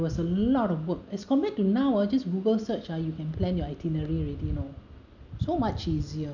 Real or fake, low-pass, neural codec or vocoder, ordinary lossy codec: real; 7.2 kHz; none; none